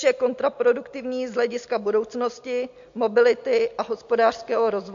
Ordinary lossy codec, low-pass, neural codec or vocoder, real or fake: MP3, 48 kbps; 7.2 kHz; none; real